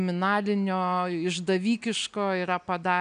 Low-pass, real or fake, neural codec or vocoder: 9.9 kHz; real; none